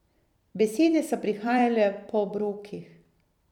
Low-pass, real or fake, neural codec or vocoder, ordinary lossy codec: 19.8 kHz; fake; vocoder, 44.1 kHz, 128 mel bands every 256 samples, BigVGAN v2; none